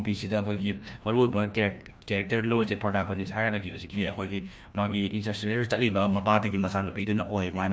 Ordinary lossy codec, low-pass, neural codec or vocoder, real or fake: none; none; codec, 16 kHz, 1 kbps, FreqCodec, larger model; fake